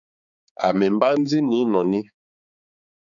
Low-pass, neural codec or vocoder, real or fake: 7.2 kHz; codec, 16 kHz, 4 kbps, X-Codec, HuBERT features, trained on balanced general audio; fake